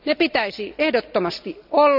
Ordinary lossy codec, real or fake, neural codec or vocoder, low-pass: none; real; none; 5.4 kHz